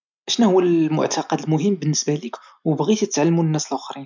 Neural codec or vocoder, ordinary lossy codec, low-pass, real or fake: none; none; 7.2 kHz; real